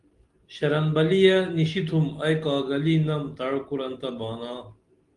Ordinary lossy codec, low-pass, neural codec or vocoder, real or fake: Opus, 24 kbps; 10.8 kHz; none; real